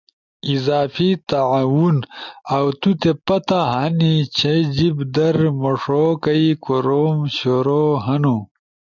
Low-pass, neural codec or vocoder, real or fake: 7.2 kHz; none; real